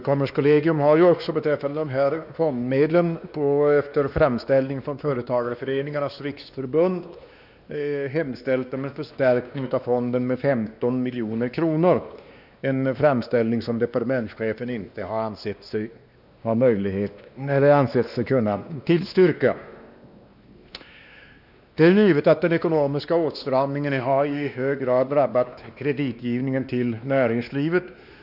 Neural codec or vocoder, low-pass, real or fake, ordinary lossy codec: codec, 16 kHz, 2 kbps, X-Codec, WavLM features, trained on Multilingual LibriSpeech; 5.4 kHz; fake; none